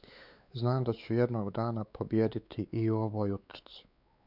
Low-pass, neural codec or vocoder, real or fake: 5.4 kHz; codec, 16 kHz, 4 kbps, X-Codec, WavLM features, trained on Multilingual LibriSpeech; fake